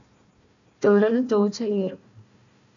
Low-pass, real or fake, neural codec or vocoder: 7.2 kHz; fake; codec, 16 kHz, 1 kbps, FunCodec, trained on Chinese and English, 50 frames a second